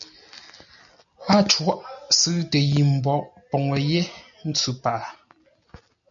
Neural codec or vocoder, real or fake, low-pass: none; real; 7.2 kHz